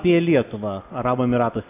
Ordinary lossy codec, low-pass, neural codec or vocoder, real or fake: AAC, 24 kbps; 3.6 kHz; none; real